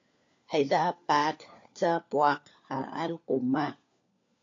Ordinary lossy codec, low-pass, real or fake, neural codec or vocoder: AAC, 48 kbps; 7.2 kHz; fake; codec, 16 kHz, 4 kbps, FunCodec, trained on LibriTTS, 50 frames a second